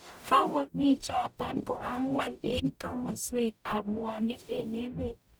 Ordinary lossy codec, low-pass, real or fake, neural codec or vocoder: none; none; fake; codec, 44.1 kHz, 0.9 kbps, DAC